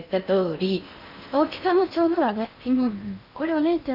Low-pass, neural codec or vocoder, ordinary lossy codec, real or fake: 5.4 kHz; codec, 16 kHz in and 24 kHz out, 0.6 kbps, FocalCodec, streaming, 4096 codes; none; fake